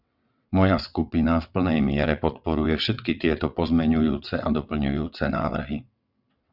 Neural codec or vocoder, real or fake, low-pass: vocoder, 22.05 kHz, 80 mel bands, WaveNeXt; fake; 5.4 kHz